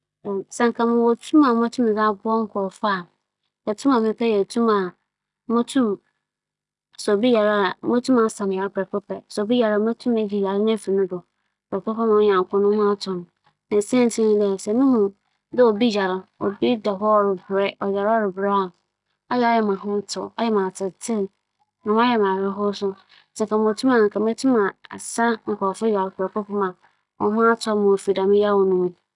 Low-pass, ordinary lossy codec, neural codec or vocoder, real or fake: 10.8 kHz; none; none; real